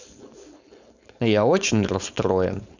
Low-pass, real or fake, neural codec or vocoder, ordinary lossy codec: 7.2 kHz; fake; codec, 16 kHz, 4.8 kbps, FACodec; none